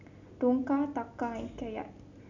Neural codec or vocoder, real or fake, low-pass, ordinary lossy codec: none; real; 7.2 kHz; none